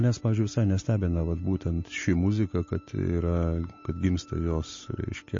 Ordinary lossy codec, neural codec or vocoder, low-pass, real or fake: MP3, 32 kbps; none; 7.2 kHz; real